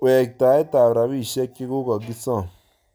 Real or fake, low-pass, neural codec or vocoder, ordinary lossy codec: real; none; none; none